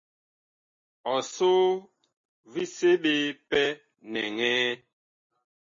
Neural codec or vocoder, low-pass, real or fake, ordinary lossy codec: none; 7.2 kHz; real; MP3, 32 kbps